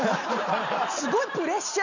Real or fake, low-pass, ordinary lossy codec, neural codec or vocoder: real; 7.2 kHz; none; none